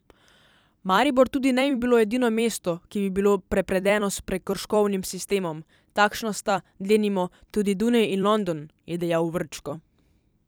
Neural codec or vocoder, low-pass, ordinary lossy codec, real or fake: vocoder, 44.1 kHz, 128 mel bands every 256 samples, BigVGAN v2; none; none; fake